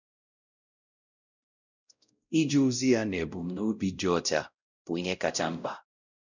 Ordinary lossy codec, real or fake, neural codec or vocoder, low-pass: none; fake; codec, 16 kHz, 0.5 kbps, X-Codec, WavLM features, trained on Multilingual LibriSpeech; 7.2 kHz